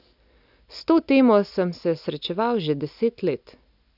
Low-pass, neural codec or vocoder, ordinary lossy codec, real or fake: 5.4 kHz; none; none; real